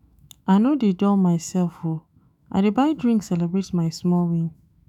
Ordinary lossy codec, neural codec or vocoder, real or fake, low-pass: none; autoencoder, 48 kHz, 128 numbers a frame, DAC-VAE, trained on Japanese speech; fake; 19.8 kHz